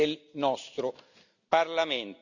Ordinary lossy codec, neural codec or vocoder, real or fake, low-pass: none; none; real; 7.2 kHz